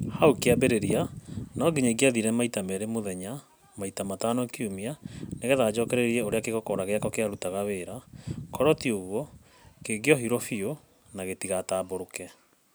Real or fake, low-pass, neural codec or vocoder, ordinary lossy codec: real; none; none; none